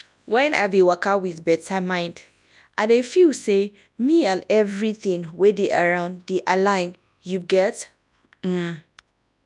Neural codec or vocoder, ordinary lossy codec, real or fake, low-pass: codec, 24 kHz, 0.9 kbps, WavTokenizer, large speech release; none; fake; 10.8 kHz